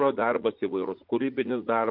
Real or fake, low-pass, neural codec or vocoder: fake; 5.4 kHz; vocoder, 44.1 kHz, 80 mel bands, Vocos